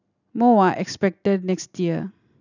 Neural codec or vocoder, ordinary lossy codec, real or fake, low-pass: none; none; real; 7.2 kHz